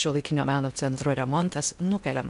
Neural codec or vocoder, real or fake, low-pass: codec, 16 kHz in and 24 kHz out, 0.8 kbps, FocalCodec, streaming, 65536 codes; fake; 10.8 kHz